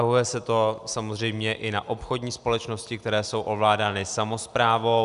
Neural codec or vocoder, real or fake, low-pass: none; real; 10.8 kHz